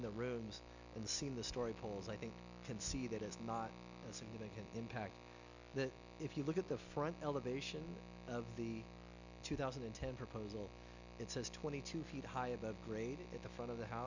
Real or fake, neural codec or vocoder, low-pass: real; none; 7.2 kHz